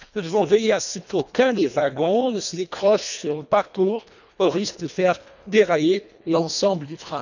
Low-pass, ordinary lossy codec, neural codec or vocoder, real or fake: 7.2 kHz; none; codec, 24 kHz, 1.5 kbps, HILCodec; fake